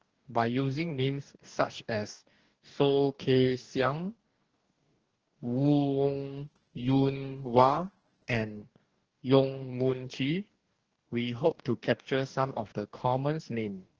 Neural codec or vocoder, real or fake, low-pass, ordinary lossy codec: codec, 44.1 kHz, 2.6 kbps, DAC; fake; 7.2 kHz; Opus, 16 kbps